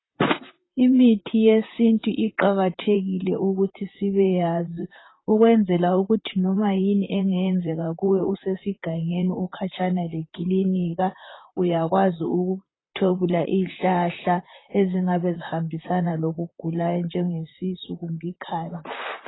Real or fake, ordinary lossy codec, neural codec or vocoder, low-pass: fake; AAC, 16 kbps; vocoder, 44.1 kHz, 128 mel bands, Pupu-Vocoder; 7.2 kHz